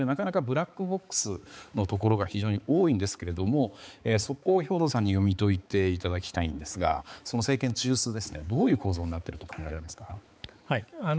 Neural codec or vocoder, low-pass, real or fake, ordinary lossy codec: codec, 16 kHz, 4 kbps, X-Codec, HuBERT features, trained on balanced general audio; none; fake; none